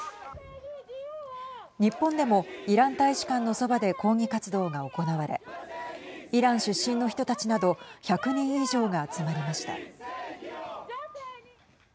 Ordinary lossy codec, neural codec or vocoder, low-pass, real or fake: none; none; none; real